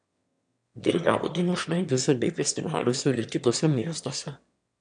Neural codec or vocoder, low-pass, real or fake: autoencoder, 22.05 kHz, a latent of 192 numbers a frame, VITS, trained on one speaker; 9.9 kHz; fake